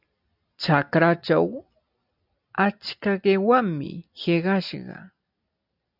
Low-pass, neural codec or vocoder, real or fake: 5.4 kHz; none; real